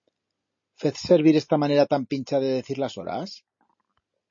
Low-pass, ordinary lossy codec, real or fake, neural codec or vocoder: 7.2 kHz; MP3, 32 kbps; real; none